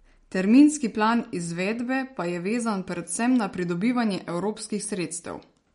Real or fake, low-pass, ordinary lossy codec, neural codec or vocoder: real; 10.8 kHz; MP3, 48 kbps; none